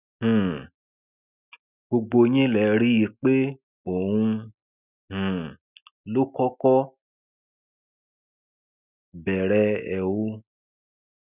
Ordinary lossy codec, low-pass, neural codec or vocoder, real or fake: none; 3.6 kHz; none; real